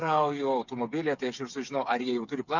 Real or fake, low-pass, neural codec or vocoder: fake; 7.2 kHz; codec, 16 kHz, 8 kbps, FreqCodec, smaller model